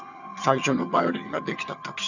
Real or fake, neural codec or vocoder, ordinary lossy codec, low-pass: fake; vocoder, 22.05 kHz, 80 mel bands, HiFi-GAN; none; 7.2 kHz